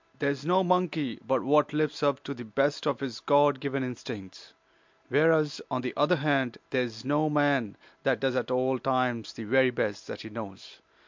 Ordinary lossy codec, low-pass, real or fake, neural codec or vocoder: MP3, 64 kbps; 7.2 kHz; real; none